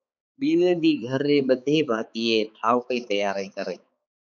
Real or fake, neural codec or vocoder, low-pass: fake; codec, 16 kHz, 4 kbps, X-Codec, HuBERT features, trained on balanced general audio; 7.2 kHz